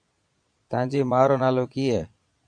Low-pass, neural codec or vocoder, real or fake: 9.9 kHz; vocoder, 22.05 kHz, 80 mel bands, Vocos; fake